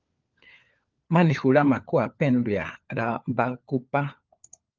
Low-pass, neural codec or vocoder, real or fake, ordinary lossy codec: 7.2 kHz; codec, 16 kHz, 4 kbps, FunCodec, trained on LibriTTS, 50 frames a second; fake; Opus, 32 kbps